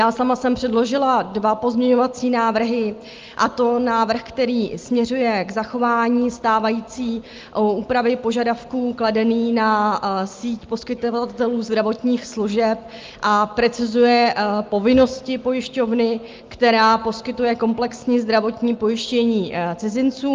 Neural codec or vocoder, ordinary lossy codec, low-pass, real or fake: none; Opus, 32 kbps; 7.2 kHz; real